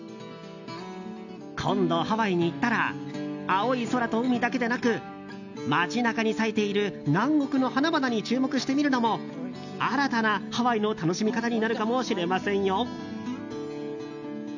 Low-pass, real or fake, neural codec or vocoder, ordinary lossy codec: 7.2 kHz; real; none; none